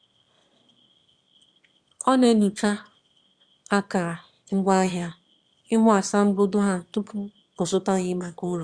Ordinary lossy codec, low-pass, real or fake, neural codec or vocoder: Opus, 64 kbps; 9.9 kHz; fake; autoencoder, 22.05 kHz, a latent of 192 numbers a frame, VITS, trained on one speaker